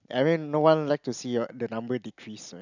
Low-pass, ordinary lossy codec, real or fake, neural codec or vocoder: 7.2 kHz; none; real; none